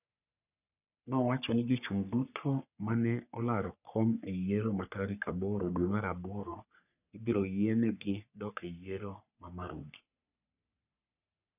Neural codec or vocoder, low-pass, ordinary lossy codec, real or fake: codec, 44.1 kHz, 3.4 kbps, Pupu-Codec; 3.6 kHz; none; fake